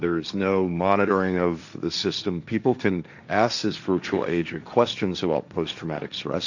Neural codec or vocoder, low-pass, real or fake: codec, 16 kHz, 1.1 kbps, Voila-Tokenizer; 7.2 kHz; fake